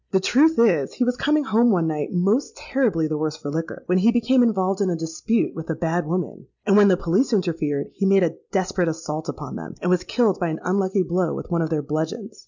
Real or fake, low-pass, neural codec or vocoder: real; 7.2 kHz; none